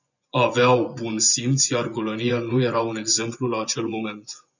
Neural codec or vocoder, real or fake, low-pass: vocoder, 24 kHz, 100 mel bands, Vocos; fake; 7.2 kHz